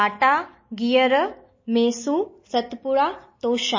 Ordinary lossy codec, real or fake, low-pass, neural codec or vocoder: MP3, 32 kbps; real; 7.2 kHz; none